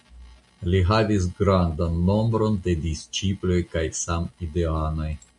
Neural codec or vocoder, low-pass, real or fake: none; 10.8 kHz; real